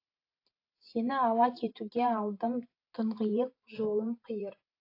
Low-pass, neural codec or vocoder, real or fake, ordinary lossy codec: 5.4 kHz; vocoder, 44.1 kHz, 128 mel bands every 512 samples, BigVGAN v2; fake; AAC, 32 kbps